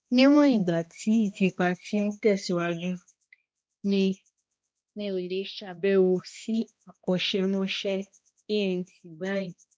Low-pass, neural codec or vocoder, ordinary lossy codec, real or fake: none; codec, 16 kHz, 1 kbps, X-Codec, HuBERT features, trained on balanced general audio; none; fake